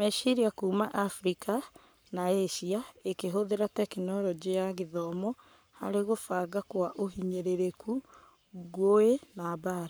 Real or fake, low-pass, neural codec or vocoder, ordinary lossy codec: fake; none; codec, 44.1 kHz, 7.8 kbps, Pupu-Codec; none